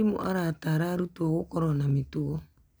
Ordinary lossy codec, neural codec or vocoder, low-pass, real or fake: none; none; none; real